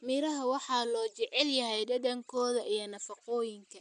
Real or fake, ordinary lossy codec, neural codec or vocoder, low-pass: real; none; none; 9.9 kHz